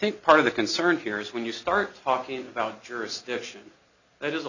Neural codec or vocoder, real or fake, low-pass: none; real; 7.2 kHz